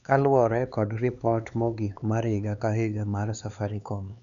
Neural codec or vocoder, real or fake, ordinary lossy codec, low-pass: codec, 16 kHz, 4 kbps, X-Codec, HuBERT features, trained on LibriSpeech; fake; none; 7.2 kHz